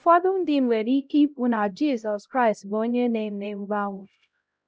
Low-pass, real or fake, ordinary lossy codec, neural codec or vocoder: none; fake; none; codec, 16 kHz, 0.5 kbps, X-Codec, HuBERT features, trained on LibriSpeech